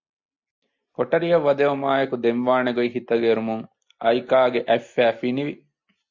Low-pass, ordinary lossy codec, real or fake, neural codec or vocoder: 7.2 kHz; MP3, 48 kbps; real; none